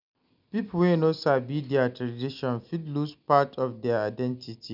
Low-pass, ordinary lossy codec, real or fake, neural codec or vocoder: 5.4 kHz; none; real; none